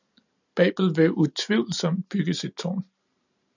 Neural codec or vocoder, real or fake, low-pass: none; real; 7.2 kHz